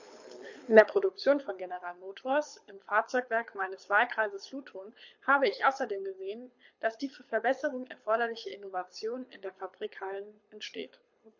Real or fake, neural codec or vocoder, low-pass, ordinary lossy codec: fake; codec, 24 kHz, 6 kbps, HILCodec; 7.2 kHz; MP3, 48 kbps